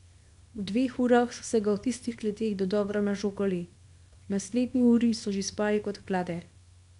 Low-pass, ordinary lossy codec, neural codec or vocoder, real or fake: 10.8 kHz; none; codec, 24 kHz, 0.9 kbps, WavTokenizer, small release; fake